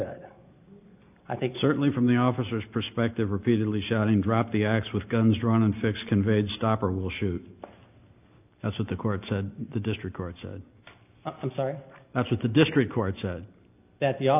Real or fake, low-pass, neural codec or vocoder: real; 3.6 kHz; none